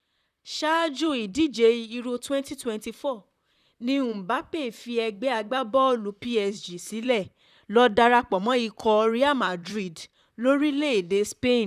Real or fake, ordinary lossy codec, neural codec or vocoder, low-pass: fake; none; vocoder, 44.1 kHz, 128 mel bands, Pupu-Vocoder; 14.4 kHz